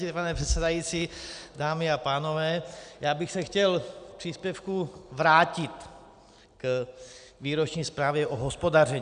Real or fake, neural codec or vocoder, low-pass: real; none; 9.9 kHz